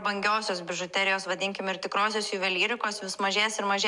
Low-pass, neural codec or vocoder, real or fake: 10.8 kHz; none; real